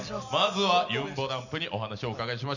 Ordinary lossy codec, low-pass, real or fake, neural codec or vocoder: none; 7.2 kHz; real; none